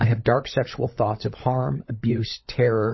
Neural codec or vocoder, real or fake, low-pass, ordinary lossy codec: codec, 16 kHz, 16 kbps, FunCodec, trained on LibriTTS, 50 frames a second; fake; 7.2 kHz; MP3, 24 kbps